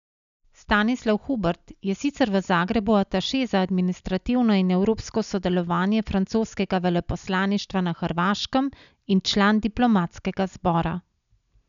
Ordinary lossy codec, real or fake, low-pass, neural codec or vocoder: none; real; 7.2 kHz; none